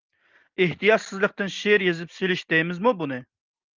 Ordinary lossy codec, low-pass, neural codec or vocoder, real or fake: Opus, 32 kbps; 7.2 kHz; none; real